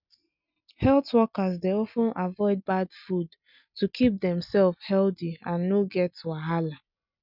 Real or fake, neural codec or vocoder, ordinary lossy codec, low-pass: real; none; none; 5.4 kHz